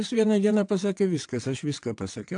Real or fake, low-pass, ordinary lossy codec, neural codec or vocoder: fake; 9.9 kHz; AAC, 64 kbps; vocoder, 22.05 kHz, 80 mel bands, WaveNeXt